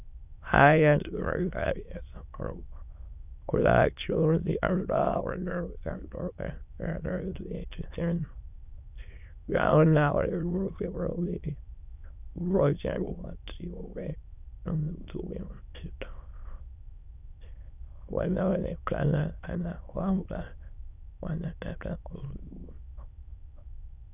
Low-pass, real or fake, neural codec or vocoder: 3.6 kHz; fake; autoencoder, 22.05 kHz, a latent of 192 numbers a frame, VITS, trained on many speakers